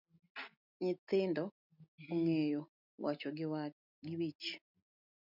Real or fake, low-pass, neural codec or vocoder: real; 5.4 kHz; none